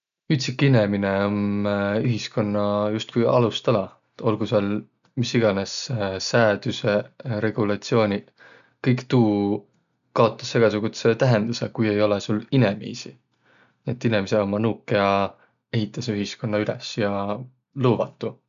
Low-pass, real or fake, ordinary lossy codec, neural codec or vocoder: 7.2 kHz; real; none; none